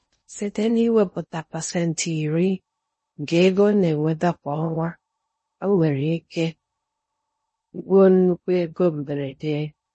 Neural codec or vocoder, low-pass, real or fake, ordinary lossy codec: codec, 16 kHz in and 24 kHz out, 0.6 kbps, FocalCodec, streaming, 2048 codes; 10.8 kHz; fake; MP3, 32 kbps